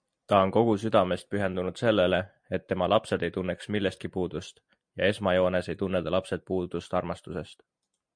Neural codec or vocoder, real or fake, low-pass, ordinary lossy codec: none; real; 9.9 kHz; MP3, 64 kbps